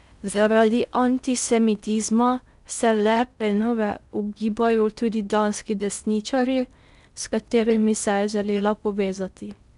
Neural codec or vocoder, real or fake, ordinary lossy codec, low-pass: codec, 16 kHz in and 24 kHz out, 0.6 kbps, FocalCodec, streaming, 4096 codes; fake; none; 10.8 kHz